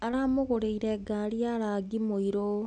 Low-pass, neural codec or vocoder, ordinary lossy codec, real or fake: 10.8 kHz; none; none; real